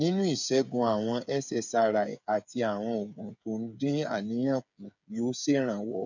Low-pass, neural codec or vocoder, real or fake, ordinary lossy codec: 7.2 kHz; codec, 16 kHz, 8 kbps, FreqCodec, smaller model; fake; none